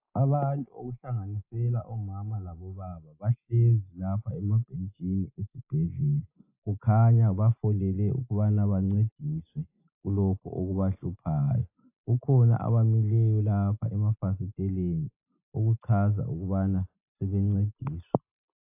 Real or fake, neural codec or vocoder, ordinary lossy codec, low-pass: real; none; AAC, 32 kbps; 3.6 kHz